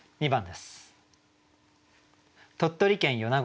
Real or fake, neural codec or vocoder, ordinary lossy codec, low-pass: real; none; none; none